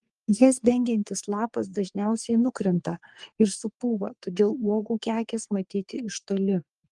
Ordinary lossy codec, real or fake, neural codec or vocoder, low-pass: Opus, 32 kbps; fake; codec, 44.1 kHz, 3.4 kbps, Pupu-Codec; 10.8 kHz